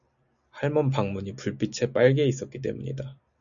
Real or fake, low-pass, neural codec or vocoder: real; 7.2 kHz; none